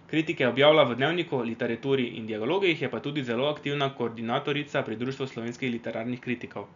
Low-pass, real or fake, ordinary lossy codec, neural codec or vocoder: 7.2 kHz; real; none; none